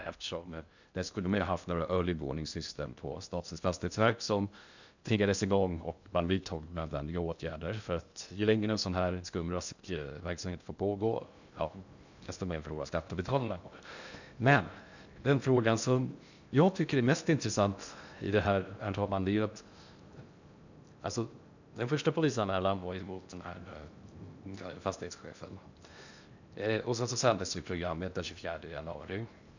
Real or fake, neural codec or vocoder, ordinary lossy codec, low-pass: fake; codec, 16 kHz in and 24 kHz out, 0.6 kbps, FocalCodec, streaming, 2048 codes; none; 7.2 kHz